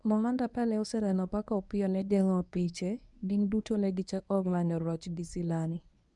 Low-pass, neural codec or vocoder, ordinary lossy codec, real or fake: 10.8 kHz; codec, 24 kHz, 0.9 kbps, WavTokenizer, medium speech release version 1; none; fake